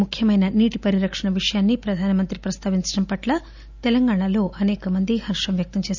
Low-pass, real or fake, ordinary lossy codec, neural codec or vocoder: 7.2 kHz; real; none; none